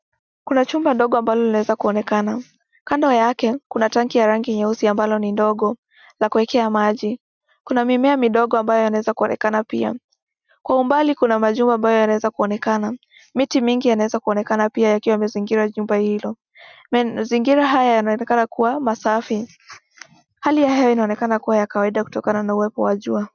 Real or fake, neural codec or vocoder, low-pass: real; none; 7.2 kHz